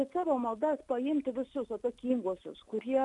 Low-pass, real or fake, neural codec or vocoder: 10.8 kHz; real; none